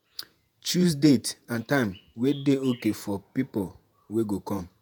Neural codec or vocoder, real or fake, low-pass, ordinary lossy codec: vocoder, 48 kHz, 128 mel bands, Vocos; fake; none; none